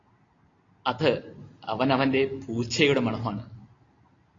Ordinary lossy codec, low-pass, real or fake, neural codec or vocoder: AAC, 32 kbps; 7.2 kHz; real; none